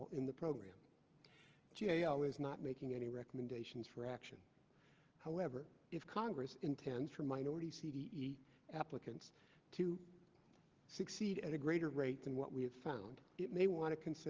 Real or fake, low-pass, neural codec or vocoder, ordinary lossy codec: real; 7.2 kHz; none; Opus, 16 kbps